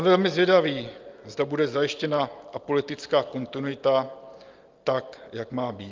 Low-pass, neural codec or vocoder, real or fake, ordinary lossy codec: 7.2 kHz; none; real; Opus, 24 kbps